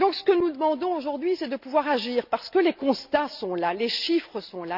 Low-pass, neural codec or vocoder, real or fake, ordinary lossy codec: 5.4 kHz; none; real; none